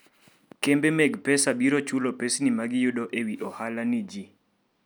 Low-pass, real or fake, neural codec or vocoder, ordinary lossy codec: none; real; none; none